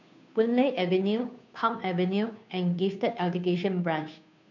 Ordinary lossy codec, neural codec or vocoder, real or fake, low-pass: none; codec, 16 kHz, 2 kbps, FunCodec, trained on Chinese and English, 25 frames a second; fake; 7.2 kHz